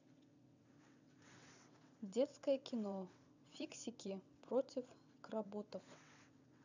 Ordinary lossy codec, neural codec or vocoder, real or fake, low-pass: none; vocoder, 22.05 kHz, 80 mel bands, WaveNeXt; fake; 7.2 kHz